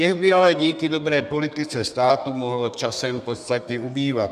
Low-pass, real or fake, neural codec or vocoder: 14.4 kHz; fake; codec, 32 kHz, 1.9 kbps, SNAC